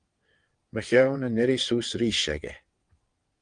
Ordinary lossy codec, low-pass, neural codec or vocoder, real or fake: Opus, 24 kbps; 9.9 kHz; vocoder, 22.05 kHz, 80 mel bands, WaveNeXt; fake